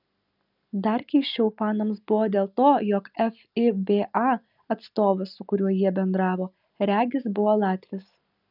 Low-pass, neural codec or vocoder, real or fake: 5.4 kHz; none; real